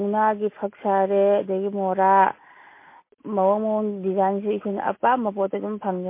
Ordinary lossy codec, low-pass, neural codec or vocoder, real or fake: MP3, 24 kbps; 3.6 kHz; none; real